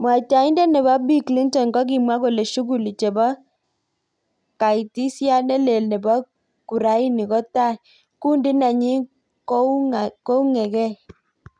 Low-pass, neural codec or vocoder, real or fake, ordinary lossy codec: 9.9 kHz; none; real; none